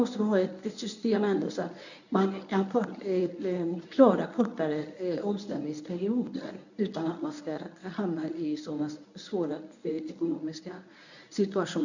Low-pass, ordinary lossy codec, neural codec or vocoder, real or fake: 7.2 kHz; none; codec, 24 kHz, 0.9 kbps, WavTokenizer, medium speech release version 1; fake